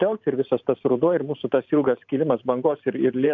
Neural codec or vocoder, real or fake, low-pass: vocoder, 44.1 kHz, 128 mel bands every 512 samples, BigVGAN v2; fake; 7.2 kHz